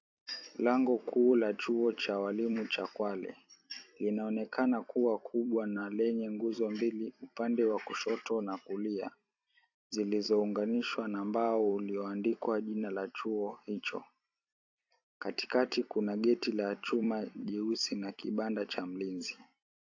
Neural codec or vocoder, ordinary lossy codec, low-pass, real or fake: vocoder, 44.1 kHz, 128 mel bands every 256 samples, BigVGAN v2; AAC, 48 kbps; 7.2 kHz; fake